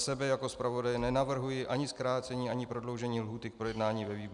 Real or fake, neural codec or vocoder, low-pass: real; none; 10.8 kHz